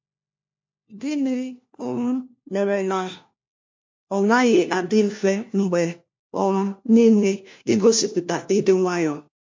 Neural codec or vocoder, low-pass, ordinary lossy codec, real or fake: codec, 16 kHz, 1 kbps, FunCodec, trained on LibriTTS, 50 frames a second; 7.2 kHz; MP3, 48 kbps; fake